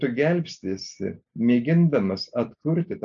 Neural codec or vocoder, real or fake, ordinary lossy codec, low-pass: none; real; AAC, 64 kbps; 7.2 kHz